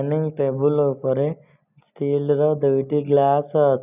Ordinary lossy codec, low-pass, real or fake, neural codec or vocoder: none; 3.6 kHz; real; none